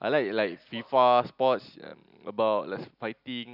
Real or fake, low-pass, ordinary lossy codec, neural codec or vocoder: real; 5.4 kHz; none; none